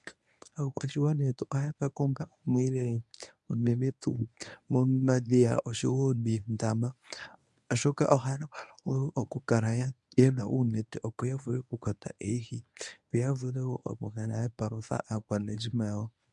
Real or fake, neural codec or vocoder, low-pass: fake; codec, 24 kHz, 0.9 kbps, WavTokenizer, medium speech release version 1; 10.8 kHz